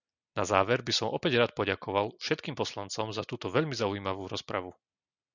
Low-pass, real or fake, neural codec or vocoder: 7.2 kHz; real; none